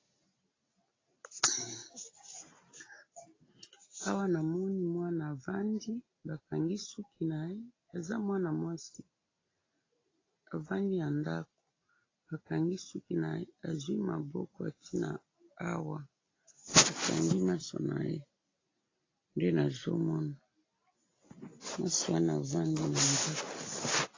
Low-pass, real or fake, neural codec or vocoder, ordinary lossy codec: 7.2 kHz; real; none; AAC, 32 kbps